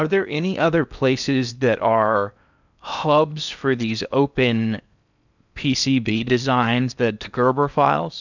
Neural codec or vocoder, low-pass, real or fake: codec, 16 kHz in and 24 kHz out, 0.8 kbps, FocalCodec, streaming, 65536 codes; 7.2 kHz; fake